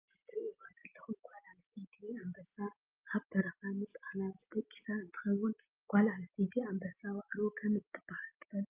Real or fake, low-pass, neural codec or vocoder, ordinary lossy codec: real; 3.6 kHz; none; Opus, 24 kbps